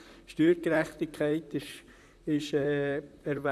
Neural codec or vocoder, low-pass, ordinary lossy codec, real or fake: vocoder, 44.1 kHz, 128 mel bands, Pupu-Vocoder; 14.4 kHz; none; fake